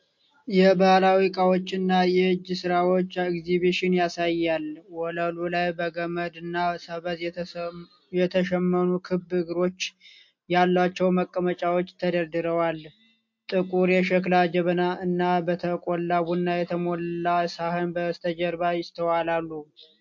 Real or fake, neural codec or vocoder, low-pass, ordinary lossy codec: real; none; 7.2 kHz; MP3, 48 kbps